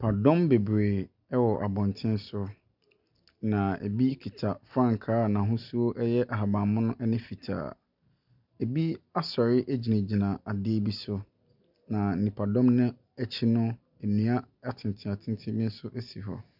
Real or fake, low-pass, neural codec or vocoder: real; 5.4 kHz; none